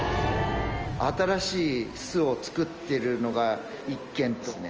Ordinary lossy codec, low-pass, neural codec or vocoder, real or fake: Opus, 24 kbps; 7.2 kHz; none; real